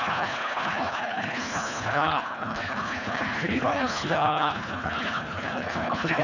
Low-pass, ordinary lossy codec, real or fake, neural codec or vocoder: 7.2 kHz; none; fake; codec, 24 kHz, 1.5 kbps, HILCodec